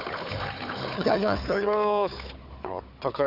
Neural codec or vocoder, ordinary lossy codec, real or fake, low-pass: codec, 16 kHz, 4 kbps, FunCodec, trained on Chinese and English, 50 frames a second; none; fake; 5.4 kHz